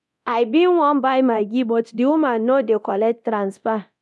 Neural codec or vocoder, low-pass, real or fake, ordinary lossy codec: codec, 24 kHz, 0.9 kbps, DualCodec; none; fake; none